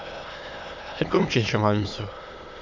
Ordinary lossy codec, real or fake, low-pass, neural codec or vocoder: MP3, 48 kbps; fake; 7.2 kHz; autoencoder, 22.05 kHz, a latent of 192 numbers a frame, VITS, trained on many speakers